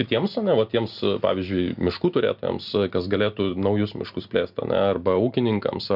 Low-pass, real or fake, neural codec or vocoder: 5.4 kHz; real; none